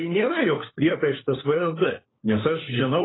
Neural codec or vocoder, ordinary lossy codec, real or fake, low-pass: codec, 16 kHz, 4 kbps, FunCodec, trained on LibriTTS, 50 frames a second; AAC, 16 kbps; fake; 7.2 kHz